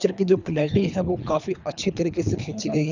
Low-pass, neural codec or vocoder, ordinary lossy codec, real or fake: 7.2 kHz; codec, 24 kHz, 3 kbps, HILCodec; none; fake